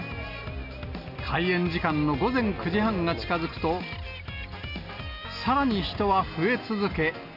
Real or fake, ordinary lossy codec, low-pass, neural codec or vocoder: real; none; 5.4 kHz; none